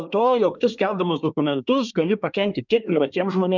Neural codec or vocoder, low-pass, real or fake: codec, 24 kHz, 1 kbps, SNAC; 7.2 kHz; fake